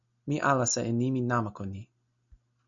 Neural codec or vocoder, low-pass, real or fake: none; 7.2 kHz; real